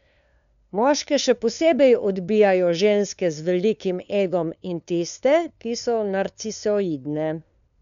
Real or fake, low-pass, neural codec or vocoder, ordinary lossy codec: fake; 7.2 kHz; codec, 16 kHz, 2 kbps, FunCodec, trained on LibriTTS, 25 frames a second; none